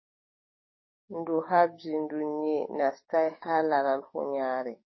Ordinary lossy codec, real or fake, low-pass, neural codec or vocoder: MP3, 24 kbps; fake; 7.2 kHz; codec, 44.1 kHz, 7.8 kbps, DAC